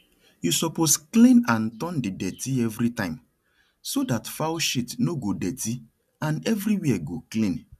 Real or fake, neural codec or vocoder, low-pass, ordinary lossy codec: real; none; 14.4 kHz; none